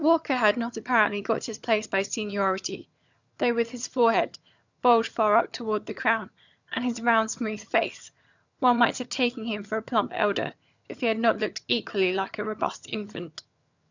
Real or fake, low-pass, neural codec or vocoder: fake; 7.2 kHz; vocoder, 22.05 kHz, 80 mel bands, HiFi-GAN